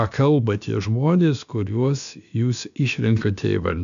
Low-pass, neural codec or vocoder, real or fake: 7.2 kHz; codec, 16 kHz, about 1 kbps, DyCAST, with the encoder's durations; fake